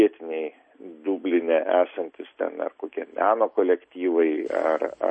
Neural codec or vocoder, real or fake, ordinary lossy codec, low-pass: none; real; MP3, 32 kbps; 9.9 kHz